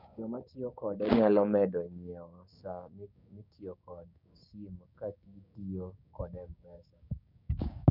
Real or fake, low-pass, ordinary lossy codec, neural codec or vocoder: fake; 5.4 kHz; none; codec, 16 kHz, 6 kbps, DAC